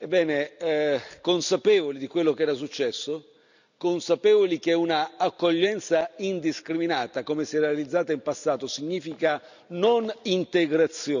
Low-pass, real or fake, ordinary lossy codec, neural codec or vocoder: 7.2 kHz; real; none; none